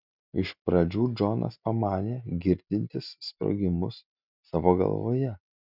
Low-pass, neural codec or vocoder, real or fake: 5.4 kHz; none; real